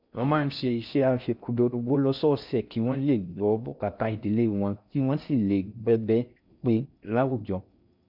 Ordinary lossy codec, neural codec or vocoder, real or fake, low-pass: none; codec, 16 kHz in and 24 kHz out, 0.6 kbps, FocalCodec, streaming, 4096 codes; fake; 5.4 kHz